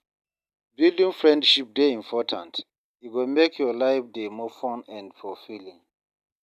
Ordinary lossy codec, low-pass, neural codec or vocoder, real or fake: none; 14.4 kHz; none; real